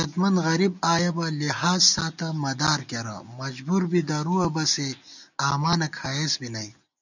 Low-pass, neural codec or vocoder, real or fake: 7.2 kHz; none; real